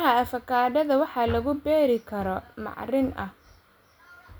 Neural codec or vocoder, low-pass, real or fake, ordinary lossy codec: none; none; real; none